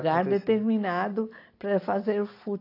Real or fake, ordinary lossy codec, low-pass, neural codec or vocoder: real; AAC, 32 kbps; 5.4 kHz; none